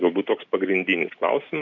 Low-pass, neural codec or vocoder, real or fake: 7.2 kHz; none; real